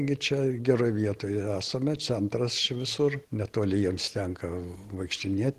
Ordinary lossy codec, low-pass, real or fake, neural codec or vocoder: Opus, 16 kbps; 14.4 kHz; real; none